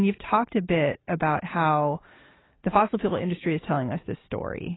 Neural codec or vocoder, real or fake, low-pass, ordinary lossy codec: none; real; 7.2 kHz; AAC, 16 kbps